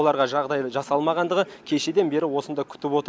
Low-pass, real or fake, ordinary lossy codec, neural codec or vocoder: none; real; none; none